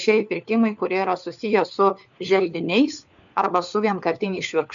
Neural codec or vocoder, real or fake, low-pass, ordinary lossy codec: codec, 16 kHz, 4 kbps, FunCodec, trained on LibriTTS, 50 frames a second; fake; 7.2 kHz; MP3, 64 kbps